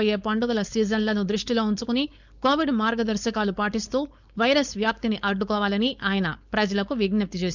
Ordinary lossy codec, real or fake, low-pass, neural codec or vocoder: none; fake; 7.2 kHz; codec, 16 kHz, 4.8 kbps, FACodec